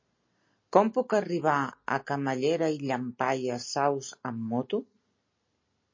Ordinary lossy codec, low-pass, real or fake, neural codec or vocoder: MP3, 32 kbps; 7.2 kHz; real; none